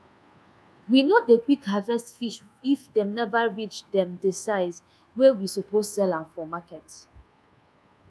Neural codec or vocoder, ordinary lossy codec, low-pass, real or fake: codec, 24 kHz, 1.2 kbps, DualCodec; none; none; fake